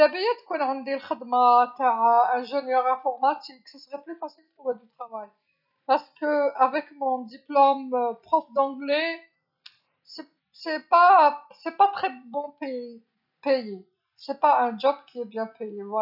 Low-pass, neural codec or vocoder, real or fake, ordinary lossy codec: 5.4 kHz; none; real; none